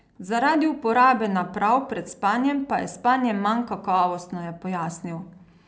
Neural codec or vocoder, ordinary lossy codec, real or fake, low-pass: none; none; real; none